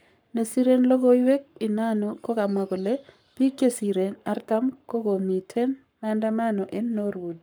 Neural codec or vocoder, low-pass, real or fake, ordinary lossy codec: codec, 44.1 kHz, 7.8 kbps, Pupu-Codec; none; fake; none